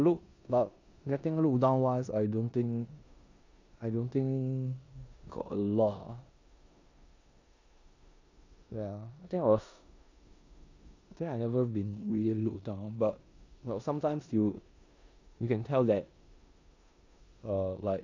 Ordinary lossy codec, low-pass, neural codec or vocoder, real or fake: AAC, 48 kbps; 7.2 kHz; codec, 16 kHz in and 24 kHz out, 0.9 kbps, LongCat-Audio-Codec, four codebook decoder; fake